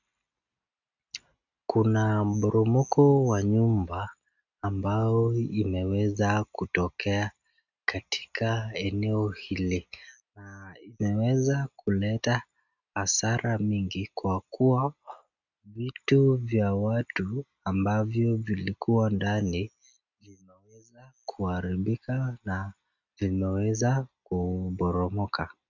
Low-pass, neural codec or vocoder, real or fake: 7.2 kHz; none; real